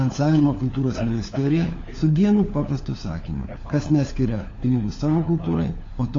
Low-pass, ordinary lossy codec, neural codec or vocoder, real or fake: 7.2 kHz; AAC, 32 kbps; codec, 16 kHz, 4 kbps, FunCodec, trained on LibriTTS, 50 frames a second; fake